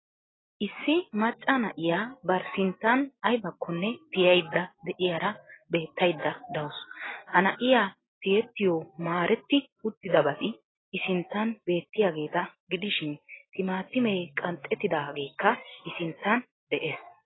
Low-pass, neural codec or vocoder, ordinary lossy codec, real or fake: 7.2 kHz; none; AAC, 16 kbps; real